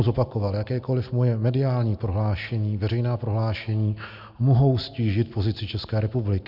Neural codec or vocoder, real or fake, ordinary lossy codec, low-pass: none; real; MP3, 48 kbps; 5.4 kHz